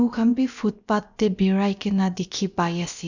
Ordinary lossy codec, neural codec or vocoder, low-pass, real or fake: none; codec, 16 kHz, about 1 kbps, DyCAST, with the encoder's durations; 7.2 kHz; fake